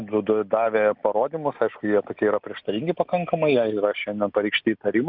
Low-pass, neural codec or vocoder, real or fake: 5.4 kHz; none; real